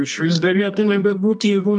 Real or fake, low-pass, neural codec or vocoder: fake; 10.8 kHz; codec, 24 kHz, 0.9 kbps, WavTokenizer, medium music audio release